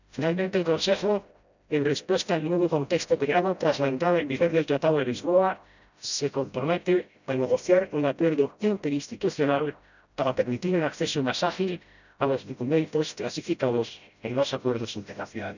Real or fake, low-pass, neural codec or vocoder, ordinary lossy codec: fake; 7.2 kHz; codec, 16 kHz, 0.5 kbps, FreqCodec, smaller model; none